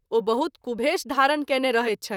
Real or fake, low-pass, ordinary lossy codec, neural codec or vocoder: fake; 19.8 kHz; none; vocoder, 44.1 kHz, 128 mel bands, Pupu-Vocoder